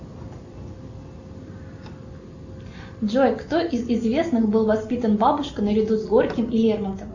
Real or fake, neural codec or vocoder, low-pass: real; none; 7.2 kHz